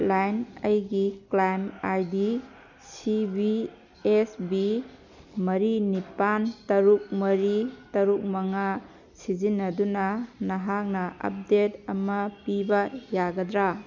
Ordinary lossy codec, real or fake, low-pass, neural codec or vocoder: none; real; 7.2 kHz; none